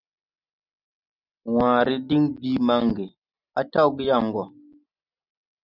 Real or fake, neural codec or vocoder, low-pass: real; none; 5.4 kHz